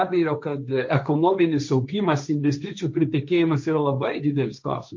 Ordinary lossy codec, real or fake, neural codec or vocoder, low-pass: MP3, 48 kbps; fake; codec, 16 kHz, 1.1 kbps, Voila-Tokenizer; 7.2 kHz